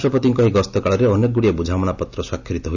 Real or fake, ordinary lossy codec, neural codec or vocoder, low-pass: real; none; none; 7.2 kHz